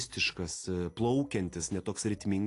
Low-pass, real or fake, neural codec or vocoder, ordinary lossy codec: 10.8 kHz; real; none; AAC, 48 kbps